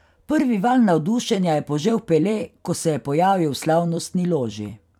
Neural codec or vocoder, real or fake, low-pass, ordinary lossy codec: vocoder, 44.1 kHz, 128 mel bands every 256 samples, BigVGAN v2; fake; 19.8 kHz; none